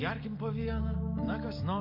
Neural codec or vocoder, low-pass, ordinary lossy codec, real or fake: none; 5.4 kHz; MP3, 32 kbps; real